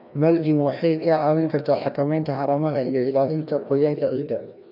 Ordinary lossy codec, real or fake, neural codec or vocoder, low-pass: none; fake; codec, 16 kHz, 1 kbps, FreqCodec, larger model; 5.4 kHz